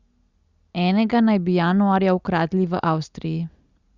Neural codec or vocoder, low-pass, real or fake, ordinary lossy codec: none; 7.2 kHz; real; Opus, 64 kbps